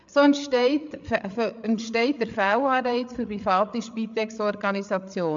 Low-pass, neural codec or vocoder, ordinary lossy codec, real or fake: 7.2 kHz; codec, 16 kHz, 8 kbps, FreqCodec, larger model; MP3, 64 kbps; fake